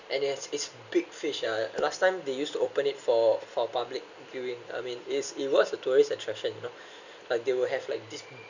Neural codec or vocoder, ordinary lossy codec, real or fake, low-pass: none; none; real; 7.2 kHz